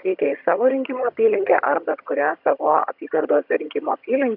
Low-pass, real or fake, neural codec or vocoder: 5.4 kHz; fake; vocoder, 22.05 kHz, 80 mel bands, HiFi-GAN